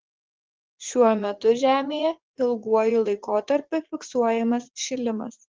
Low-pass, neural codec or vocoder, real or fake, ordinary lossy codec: 7.2 kHz; vocoder, 44.1 kHz, 80 mel bands, Vocos; fake; Opus, 16 kbps